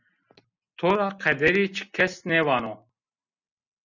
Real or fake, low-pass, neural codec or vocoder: real; 7.2 kHz; none